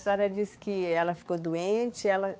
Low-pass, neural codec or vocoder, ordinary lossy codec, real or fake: none; codec, 16 kHz, 4 kbps, X-Codec, HuBERT features, trained on balanced general audio; none; fake